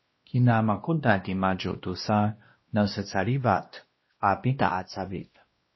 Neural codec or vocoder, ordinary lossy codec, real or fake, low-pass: codec, 16 kHz, 1 kbps, X-Codec, WavLM features, trained on Multilingual LibriSpeech; MP3, 24 kbps; fake; 7.2 kHz